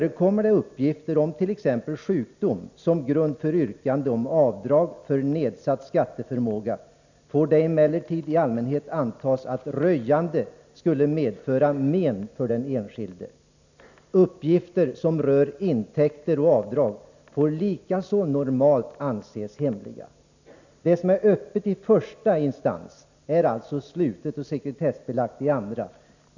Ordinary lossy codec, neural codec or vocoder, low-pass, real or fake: none; none; 7.2 kHz; real